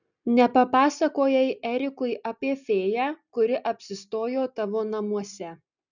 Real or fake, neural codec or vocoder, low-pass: real; none; 7.2 kHz